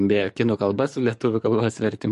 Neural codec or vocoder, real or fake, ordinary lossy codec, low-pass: codec, 44.1 kHz, 7.8 kbps, DAC; fake; MP3, 48 kbps; 14.4 kHz